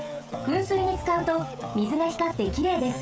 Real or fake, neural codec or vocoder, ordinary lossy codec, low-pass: fake; codec, 16 kHz, 16 kbps, FreqCodec, smaller model; none; none